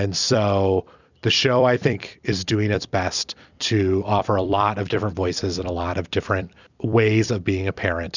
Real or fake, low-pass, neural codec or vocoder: fake; 7.2 kHz; vocoder, 44.1 kHz, 128 mel bands every 256 samples, BigVGAN v2